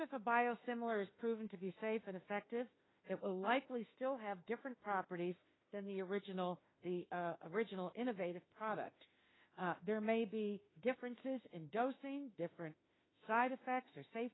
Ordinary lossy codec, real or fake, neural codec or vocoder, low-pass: AAC, 16 kbps; fake; autoencoder, 48 kHz, 32 numbers a frame, DAC-VAE, trained on Japanese speech; 7.2 kHz